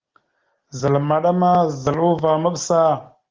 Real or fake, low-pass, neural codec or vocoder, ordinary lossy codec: real; 7.2 kHz; none; Opus, 16 kbps